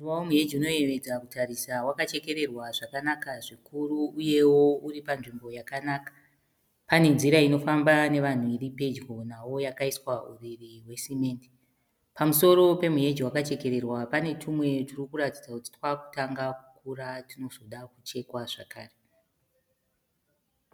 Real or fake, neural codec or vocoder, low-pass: real; none; 19.8 kHz